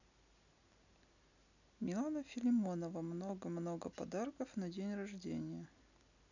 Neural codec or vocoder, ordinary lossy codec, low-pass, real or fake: none; none; 7.2 kHz; real